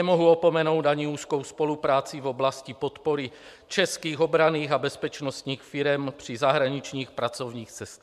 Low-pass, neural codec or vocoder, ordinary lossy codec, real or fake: 14.4 kHz; vocoder, 44.1 kHz, 128 mel bands every 512 samples, BigVGAN v2; MP3, 96 kbps; fake